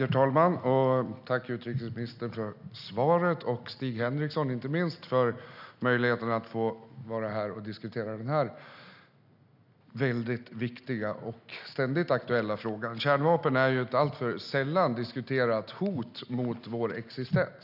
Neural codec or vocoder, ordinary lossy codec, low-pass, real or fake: none; none; 5.4 kHz; real